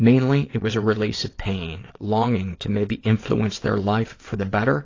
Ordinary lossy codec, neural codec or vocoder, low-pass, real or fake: AAC, 32 kbps; vocoder, 22.05 kHz, 80 mel bands, WaveNeXt; 7.2 kHz; fake